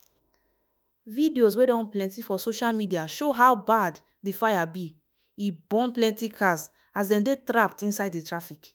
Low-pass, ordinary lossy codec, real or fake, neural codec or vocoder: none; none; fake; autoencoder, 48 kHz, 32 numbers a frame, DAC-VAE, trained on Japanese speech